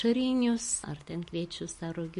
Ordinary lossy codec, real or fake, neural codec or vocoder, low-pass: MP3, 48 kbps; real; none; 14.4 kHz